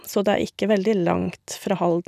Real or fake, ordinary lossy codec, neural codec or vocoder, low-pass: real; none; none; 14.4 kHz